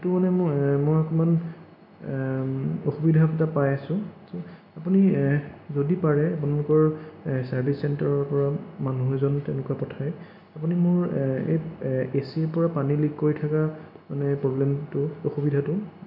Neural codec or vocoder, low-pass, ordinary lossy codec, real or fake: none; 5.4 kHz; MP3, 48 kbps; real